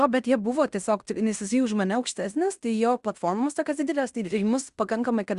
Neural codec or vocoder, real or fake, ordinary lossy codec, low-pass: codec, 16 kHz in and 24 kHz out, 0.9 kbps, LongCat-Audio-Codec, fine tuned four codebook decoder; fake; Opus, 64 kbps; 10.8 kHz